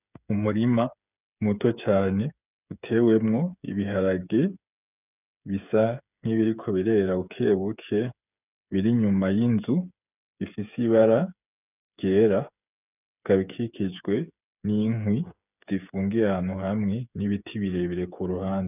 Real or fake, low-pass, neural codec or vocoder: fake; 3.6 kHz; codec, 16 kHz, 8 kbps, FreqCodec, smaller model